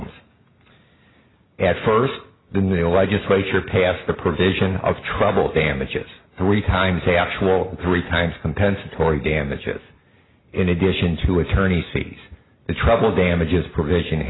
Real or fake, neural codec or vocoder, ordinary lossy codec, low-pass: real; none; AAC, 16 kbps; 7.2 kHz